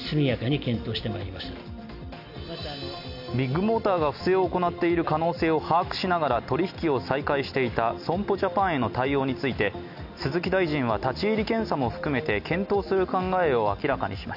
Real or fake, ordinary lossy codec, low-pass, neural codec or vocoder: real; none; 5.4 kHz; none